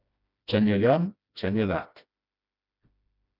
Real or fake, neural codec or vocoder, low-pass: fake; codec, 16 kHz, 1 kbps, FreqCodec, smaller model; 5.4 kHz